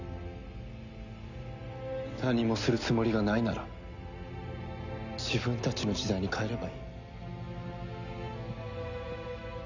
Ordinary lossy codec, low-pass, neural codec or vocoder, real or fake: none; 7.2 kHz; none; real